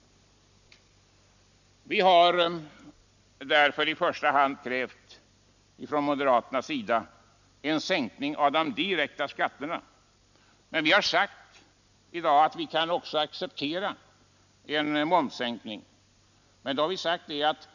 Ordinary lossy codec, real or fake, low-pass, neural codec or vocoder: none; real; 7.2 kHz; none